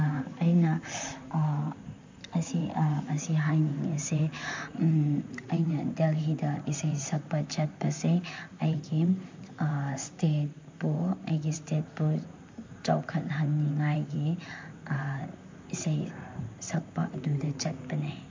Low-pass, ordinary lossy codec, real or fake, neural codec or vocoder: 7.2 kHz; MP3, 64 kbps; fake; vocoder, 44.1 kHz, 128 mel bands, Pupu-Vocoder